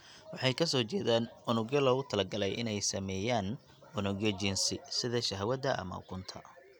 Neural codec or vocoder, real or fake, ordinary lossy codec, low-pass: none; real; none; none